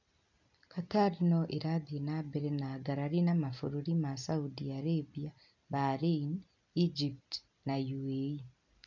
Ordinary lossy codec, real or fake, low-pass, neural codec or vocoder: none; real; 7.2 kHz; none